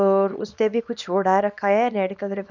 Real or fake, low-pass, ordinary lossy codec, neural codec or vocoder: fake; 7.2 kHz; none; codec, 24 kHz, 0.9 kbps, WavTokenizer, small release